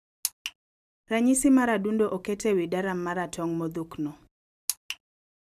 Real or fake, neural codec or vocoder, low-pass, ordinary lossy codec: real; none; 14.4 kHz; none